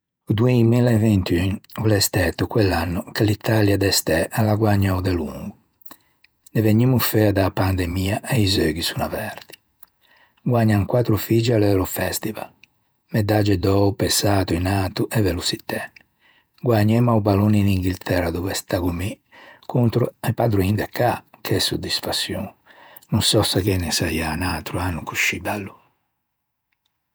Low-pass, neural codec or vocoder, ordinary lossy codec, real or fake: none; vocoder, 48 kHz, 128 mel bands, Vocos; none; fake